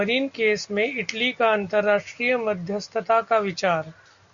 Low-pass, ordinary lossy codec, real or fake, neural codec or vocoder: 7.2 kHz; Opus, 64 kbps; real; none